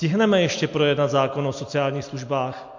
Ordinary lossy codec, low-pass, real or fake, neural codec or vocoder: MP3, 48 kbps; 7.2 kHz; real; none